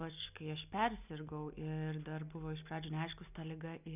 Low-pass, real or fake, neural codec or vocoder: 3.6 kHz; real; none